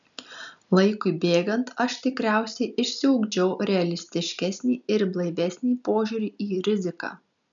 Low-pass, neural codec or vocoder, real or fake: 7.2 kHz; none; real